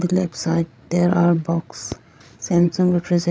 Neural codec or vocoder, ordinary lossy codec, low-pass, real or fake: codec, 16 kHz, 16 kbps, FreqCodec, larger model; none; none; fake